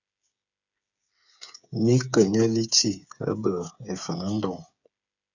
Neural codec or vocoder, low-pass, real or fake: codec, 16 kHz, 8 kbps, FreqCodec, smaller model; 7.2 kHz; fake